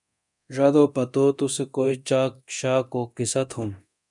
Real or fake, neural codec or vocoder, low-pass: fake; codec, 24 kHz, 0.9 kbps, DualCodec; 10.8 kHz